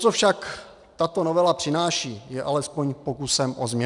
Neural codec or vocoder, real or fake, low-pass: none; real; 10.8 kHz